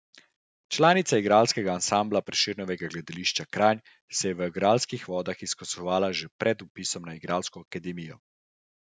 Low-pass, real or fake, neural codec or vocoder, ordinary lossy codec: none; real; none; none